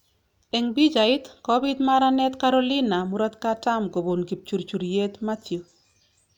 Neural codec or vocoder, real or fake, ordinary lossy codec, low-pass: none; real; none; 19.8 kHz